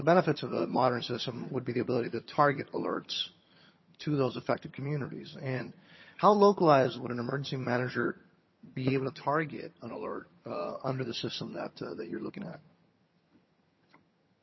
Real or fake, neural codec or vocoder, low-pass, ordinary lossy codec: fake; vocoder, 22.05 kHz, 80 mel bands, HiFi-GAN; 7.2 kHz; MP3, 24 kbps